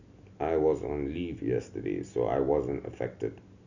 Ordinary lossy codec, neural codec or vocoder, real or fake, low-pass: Opus, 64 kbps; none; real; 7.2 kHz